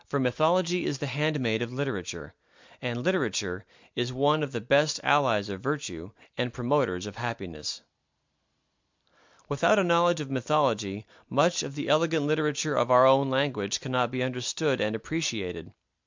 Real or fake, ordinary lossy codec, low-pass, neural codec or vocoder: real; MP3, 64 kbps; 7.2 kHz; none